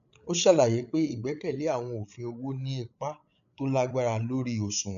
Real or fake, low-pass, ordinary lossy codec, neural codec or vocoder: fake; 7.2 kHz; none; codec, 16 kHz, 8 kbps, FreqCodec, larger model